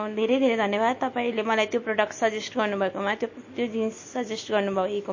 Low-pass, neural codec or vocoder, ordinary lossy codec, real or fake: 7.2 kHz; none; MP3, 32 kbps; real